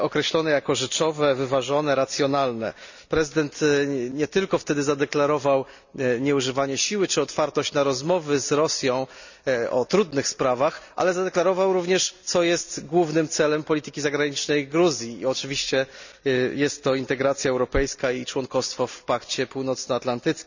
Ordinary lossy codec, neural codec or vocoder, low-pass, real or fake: none; none; 7.2 kHz; real